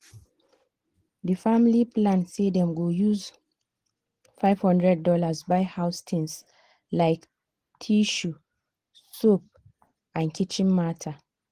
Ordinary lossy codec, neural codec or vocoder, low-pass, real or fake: Opus, 16 kbps; none; 14.4 kHz; real